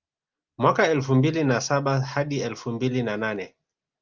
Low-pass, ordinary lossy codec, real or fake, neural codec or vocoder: 7.2 kHz; Opus, 24 kbps; real; none